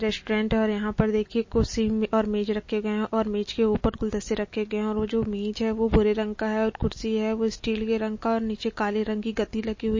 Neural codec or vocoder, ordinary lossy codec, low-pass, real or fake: none; MP3, 32 kbps; 7.2 kHz; real